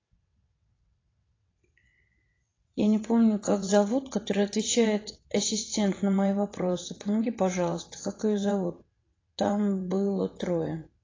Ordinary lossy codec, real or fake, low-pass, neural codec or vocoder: AAC, 32 kbps; fake; 7.2 kHz; vocoder, 22.05 kHz, 80 mel bands, WaveNeXt